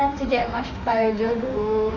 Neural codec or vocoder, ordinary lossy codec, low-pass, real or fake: autoencoder, 48 kHz, 32 numbers a frame, DAC-VAE, trained on Japanese speech; none; 7.2 kHz; fake